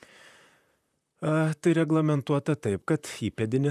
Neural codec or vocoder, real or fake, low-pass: vocoder, 44.1 kHz, 128 mel bands, Pupu-Vocoder; fake; 14.4 kHz